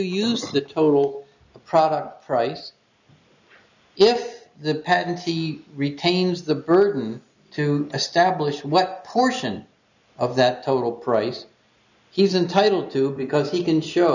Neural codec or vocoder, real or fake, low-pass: none; real; 7.2 kHz